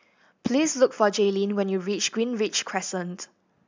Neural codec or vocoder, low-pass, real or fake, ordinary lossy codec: none; 7.2 kHz; real; MP3, 64 kbps